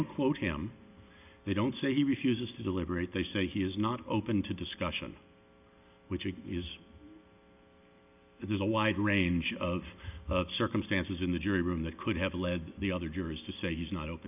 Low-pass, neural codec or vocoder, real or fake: 3.6 kHz; none; real